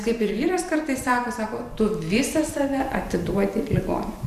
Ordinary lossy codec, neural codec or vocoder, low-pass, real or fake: MP3, 96 kbps; vocoder, 44.1 kHz, 128 mel bands every 512 samples, BigVGAN v2; 14.4 kHz; fake